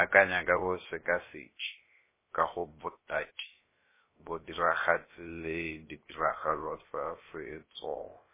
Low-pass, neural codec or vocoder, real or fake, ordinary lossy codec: 3.6 kHz; codec, 16 kHz, about 1 kbps, DyCAST, with the encoder's durations; fake; MP3, 16 kbps